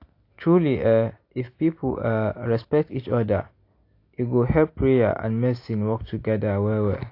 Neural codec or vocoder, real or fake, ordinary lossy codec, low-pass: none; real; AAC, 32 kbps; 5.4 kHz